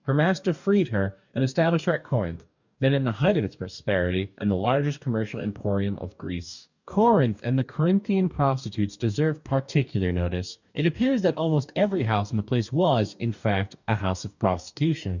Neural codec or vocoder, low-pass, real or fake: codec, 44.1 kHz, 2.6 kbps, DAC; 7.2 kHz; fake